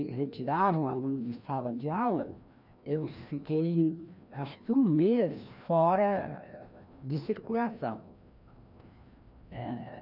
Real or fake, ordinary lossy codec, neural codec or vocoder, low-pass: fake; none; codec, 16 kHz, 1 kbps, FreqCodec, larger model; 5.4 kHz